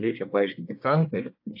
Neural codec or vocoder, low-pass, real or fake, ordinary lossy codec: codec, 24 kHz, 1 kbps, SNAC; 5.4 kHz; fake; AAC, 48 kbps